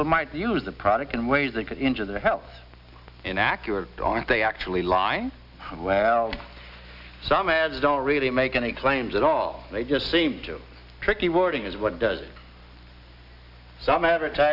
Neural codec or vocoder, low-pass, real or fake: none; 5.4 kHz; real